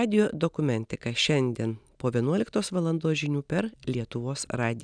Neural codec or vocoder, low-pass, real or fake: none; 9.9 kHz; real